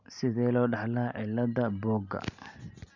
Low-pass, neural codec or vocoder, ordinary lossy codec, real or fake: 7.2 kHz; none; none; real